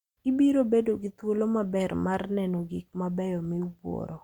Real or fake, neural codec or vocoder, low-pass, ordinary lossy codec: real; none; 19.8 kHz; Opus, 64 kbps